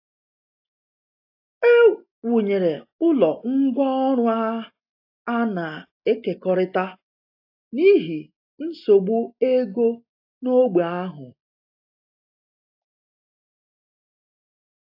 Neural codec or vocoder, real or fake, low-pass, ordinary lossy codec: none; real; 5.4 kHz; AAC, 32 kbps